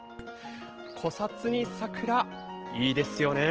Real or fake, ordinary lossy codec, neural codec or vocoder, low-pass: real; Opus, 16 kbps; none; 7.2 kHz